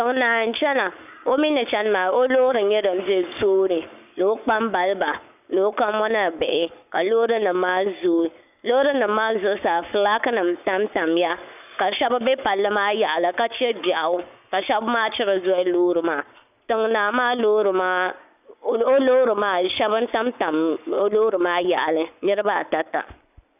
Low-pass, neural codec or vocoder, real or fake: 3.6 kHz; codec, 44.1 kHz, 7.8 kbps, Pupu-Codec; fake